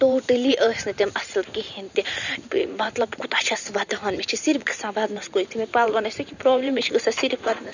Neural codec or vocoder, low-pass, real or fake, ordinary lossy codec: vocoder, 44.1 kHz, 128 mel bands every 512 samples, BigVGAN v2; 7.2 kHz; fake; none